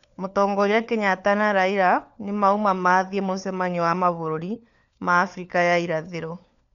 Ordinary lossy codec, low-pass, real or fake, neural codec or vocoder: none; 7.2 kHz; fake; codec, 16 kHz, 4 kbps, FunCodec, trained on LibriTTS, 50 frames a second